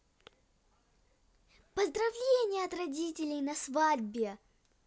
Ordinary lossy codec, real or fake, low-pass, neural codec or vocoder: none; real; none; none